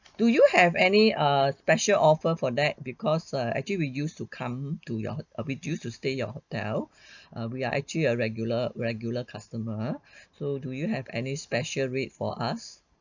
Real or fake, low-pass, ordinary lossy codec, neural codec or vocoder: real; 7.2 kHz; none; none